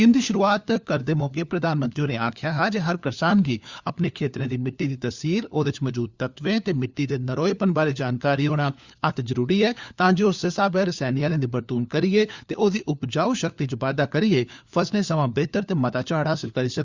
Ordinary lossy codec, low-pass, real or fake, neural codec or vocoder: Opus, 64 kbps; 7.2 kHz; fake; codec, 16 kHz, 4 kbps, FunCodec, trained on LibriTTS, 50 frames a second